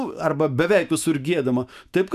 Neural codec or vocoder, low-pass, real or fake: autoencoder, 48 kHz, 128 numbers a frame, DAC-VAE, trained on Japanese speech; 14.4 kHz; fake